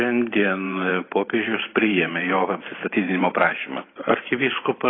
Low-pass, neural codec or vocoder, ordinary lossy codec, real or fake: 7.2 kHz; vocoder, 44.1 kHz, 128 mel bands every 512 samples, BigVGAN v2; AAC, 16 kbps; fake